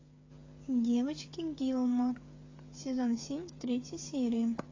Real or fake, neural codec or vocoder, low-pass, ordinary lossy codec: fake; codec, 16 kHz, 8 kbps, FreqCodec, smaller model; 7.2 kHz; AAC, 32 kbps